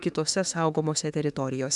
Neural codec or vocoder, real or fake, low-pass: autoencoder, 48 kHz, 32 numbers a frame, DAC-VAE, trained on Japanese speech; fake; 10.8 kHz